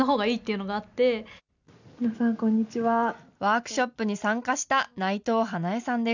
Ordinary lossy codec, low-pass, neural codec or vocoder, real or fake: none; 7.2 kHz; none; real